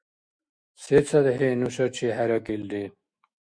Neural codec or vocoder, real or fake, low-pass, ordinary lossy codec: autoencoder, 48 kHz, 128 numbers a frame, DAC-VAE, trained on Japanese speech; fake; 9.9 kHz; Opus, 64 kbps